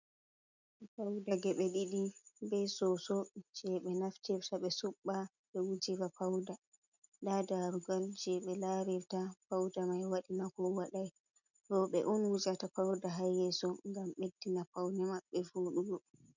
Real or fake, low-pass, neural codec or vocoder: real; 7.2 kHz; none